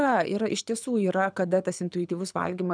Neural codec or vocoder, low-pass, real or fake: codec, 24 kHz, 6 kbps, HILCodec; 9.9 kHz; fake